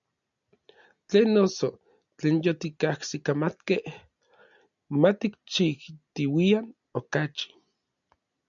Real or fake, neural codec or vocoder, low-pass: real; none; 7.2 kHz